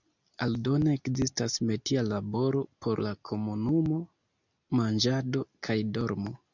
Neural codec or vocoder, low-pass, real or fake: none; 7.2 kHz; real